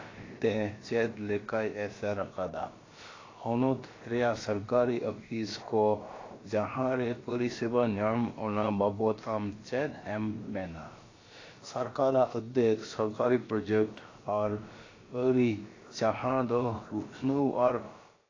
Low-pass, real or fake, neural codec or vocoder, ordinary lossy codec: 7.2 kHz; fake; codec, 16 kHz, about 1 kbps, DyCAST, with the encoder's durations; AAC, 32 kbps